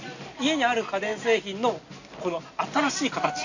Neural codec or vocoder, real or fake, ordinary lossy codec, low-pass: none; real; none; 7.2 kHz